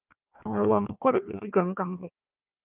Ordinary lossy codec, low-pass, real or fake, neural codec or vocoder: Opus, 32 kbps; 3.6 kHz; fake; codec, 16 kHz, 1 kbps, FunCodec, trained on Chinese and English, 50 frames a second